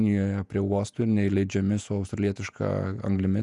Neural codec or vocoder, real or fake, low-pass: vocoder, 48 kHz, 128 mel bands, Vocos; fake; 10.8 kHz